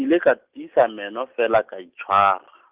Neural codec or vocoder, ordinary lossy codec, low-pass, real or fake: none; Opus, 32 kbps; 3.6 kHz; real